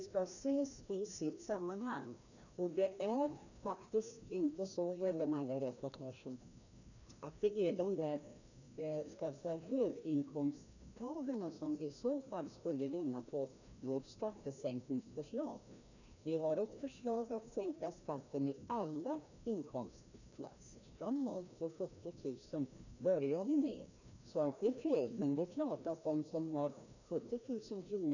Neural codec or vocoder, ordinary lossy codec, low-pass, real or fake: codec, 16 kHz, 1 kbps, FreqCodec, larger model; none; 7.2 kHz; fake